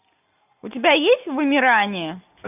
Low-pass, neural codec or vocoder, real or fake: 3.6 kHz; none; real